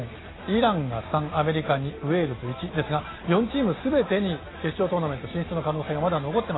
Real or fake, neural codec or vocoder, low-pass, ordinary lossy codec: real; none; 7.2 kHz; AAC, 16 kbps